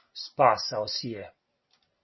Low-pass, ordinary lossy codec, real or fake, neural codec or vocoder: 7.2 kHz; MP3, 24 kbps; real; none